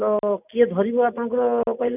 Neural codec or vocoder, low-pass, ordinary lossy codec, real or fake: none; 3.6 kHz; none; real